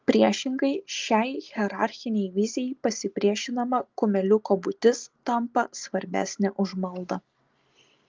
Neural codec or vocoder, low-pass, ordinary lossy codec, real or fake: none; 7.2 kHz; Opus, 32 kbps; real